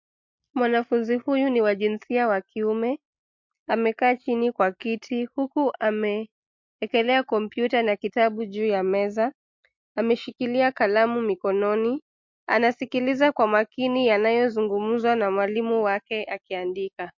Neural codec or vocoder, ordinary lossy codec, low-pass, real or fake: none; MP3, 48 kbps; 7.2 kHz; real